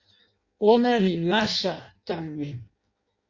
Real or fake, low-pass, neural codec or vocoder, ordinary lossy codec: fake; 7.2 kHz; codec, 16 kHz in and 24 kHz out, 0.6 kbps, FireRedTTS-2 codec; Opus, 64 kbps